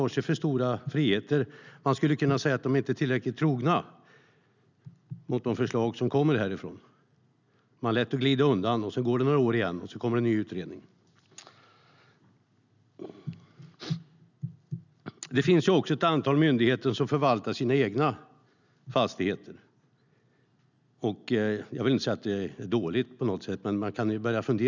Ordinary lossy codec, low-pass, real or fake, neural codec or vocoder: none; 7.2 kHz; real; none